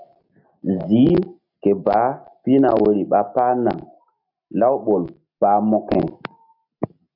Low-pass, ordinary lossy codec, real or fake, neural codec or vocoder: 5.4 kHz; AAC, 48 kbps; real; none